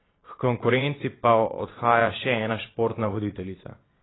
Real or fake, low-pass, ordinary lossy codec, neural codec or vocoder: fake; 7.2 kHz; AAC, 16 kbps; vocoder, 22.05 kHz, 80 mel bands, Vocos